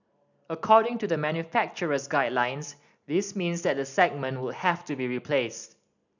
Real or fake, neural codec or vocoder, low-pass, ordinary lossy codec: real; none; 7.2 kHz; none